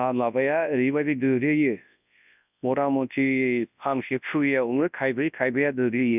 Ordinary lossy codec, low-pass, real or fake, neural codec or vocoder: none; 3.6 kHz; fake; codec, 24 kHz, 0.9 kbps, WavTokenizer, large speech release